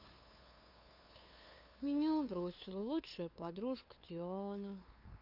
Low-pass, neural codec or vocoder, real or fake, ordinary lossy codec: 5.4 kHz; codec, 16 kHz, 2 kbps, FunCodec, trained on LibriTTS, 25 frames a second; fake; AAC, 48 kbps